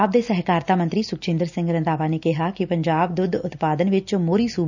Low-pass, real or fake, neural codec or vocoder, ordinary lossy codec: 7.2 kHz; real; none; none